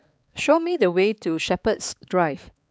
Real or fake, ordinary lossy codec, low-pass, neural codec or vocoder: fake; none; none; codec, 16 kHz, 4 kbps, X-Codec, HuBERT features, trained on balanced general audio